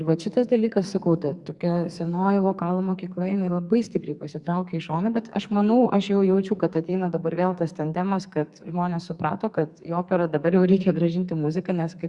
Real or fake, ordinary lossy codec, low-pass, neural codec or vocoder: fake; Opus, 64 kbps; 10.8 kHz; codec, 44.1 kHz, 2.6 kbps, SNAC